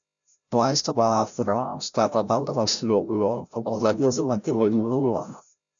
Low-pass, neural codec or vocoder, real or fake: 7.2 kHz; codec, 16 kHz, 0.5 kbps, FreqCodec, larger model; fake